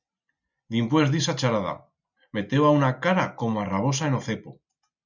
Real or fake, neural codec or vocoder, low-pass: real; none; 7.2 kHz